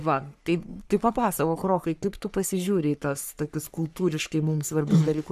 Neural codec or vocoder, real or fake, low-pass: codec, 44.1 kHz, 3.4 kbps, Pupu-Codec; fake; 14.4 kHz